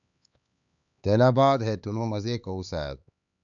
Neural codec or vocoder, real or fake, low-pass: codec, 16 kHz, 4 kbps, X-Codec, HuBERT features, trained on LibriSpeech; fake; 7.2 kHz